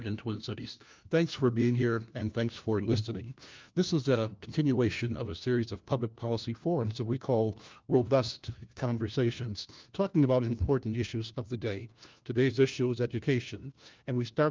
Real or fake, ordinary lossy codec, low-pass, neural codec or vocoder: fake; Opus, 24 kbps; 7.2 kHz; codec, 16 kHz, 1 kbps, FunCodec, trained on LibriTTS, 50 frames a second